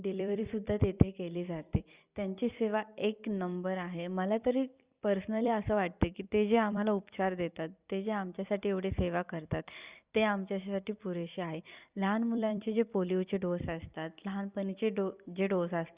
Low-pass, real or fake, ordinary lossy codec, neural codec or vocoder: 3.6 kHz; fake; Opus, 64 kbps; vocoder, 44.1 kHz, 128 mel bands every 256 samples, BigVGAN v2